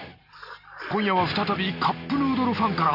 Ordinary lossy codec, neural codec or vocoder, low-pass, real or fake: none; none; 5.4 kHz; real